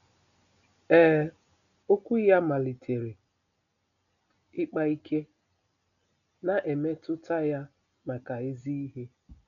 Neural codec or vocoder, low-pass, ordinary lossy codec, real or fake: none; 7.2 kHz; none; real